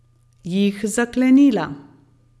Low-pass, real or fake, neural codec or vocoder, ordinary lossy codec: none; real; none; none